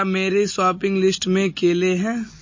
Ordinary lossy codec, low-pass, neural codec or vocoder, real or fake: MP3, 32 kbps; 7.2 kHz; none; real